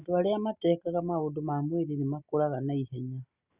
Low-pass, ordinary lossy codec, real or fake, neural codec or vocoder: 3.6 kHz; Opus, 64 kbps; real; none